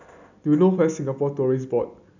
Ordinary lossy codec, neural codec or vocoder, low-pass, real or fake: none; none; 7.2 kHz; real